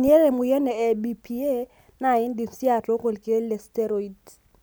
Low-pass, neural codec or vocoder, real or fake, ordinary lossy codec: none; none; real; none